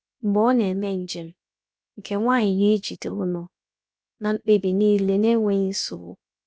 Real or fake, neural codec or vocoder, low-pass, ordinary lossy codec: fake; codec, 16 kHz, about 1 kbps, DyCAST, with the encoder's durations; none; none